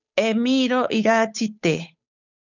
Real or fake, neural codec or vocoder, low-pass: fake; codec, 16 kHz, 8 kbps, FunCodec, trained on Chinese and English, 25 frames a second; 7.2 kHz